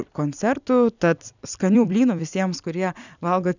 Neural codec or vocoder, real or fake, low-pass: vocoder, 44.1 kHz, 128 mel bands every 256 samples, BigVGAN v2; fake; 7.2 kHz